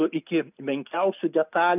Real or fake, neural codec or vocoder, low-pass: real; none; 3.6 kHz